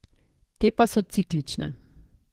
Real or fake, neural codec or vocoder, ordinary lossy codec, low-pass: fake; codec, 32 kHz, 1.9 kbps, SNAC; Opus, 16 kbps; 14.4 kHz